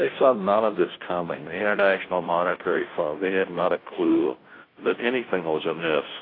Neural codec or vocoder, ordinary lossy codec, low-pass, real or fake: codec, 16 kHz, 0.5 kbps, FunCodec, trained on Chinese and English, 25 frames a second; AAC, 24 kbps; 5.4 kHz; fake